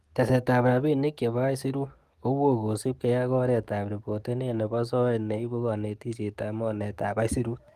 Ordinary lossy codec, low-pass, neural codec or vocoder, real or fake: Opus, 24 kbps; 14.4 kHz; codec, 44.1 kHz, 7.8 kbps, DAC; fake